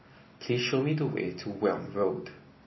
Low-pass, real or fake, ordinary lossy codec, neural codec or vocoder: 7.2 kHz; real; MP3, 24 kbps; none